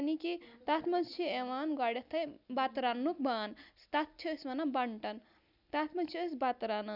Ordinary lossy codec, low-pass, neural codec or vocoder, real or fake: none; 5.4 kHz; none; real